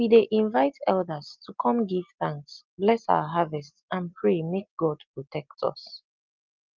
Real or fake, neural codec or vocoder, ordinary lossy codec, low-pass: real; none; Opus, 32 kbps; 7.2 kHz